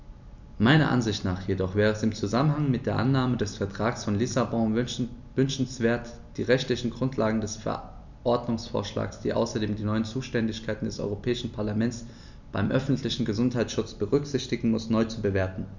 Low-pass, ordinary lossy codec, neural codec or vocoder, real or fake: 7.2 kHz; none; none; real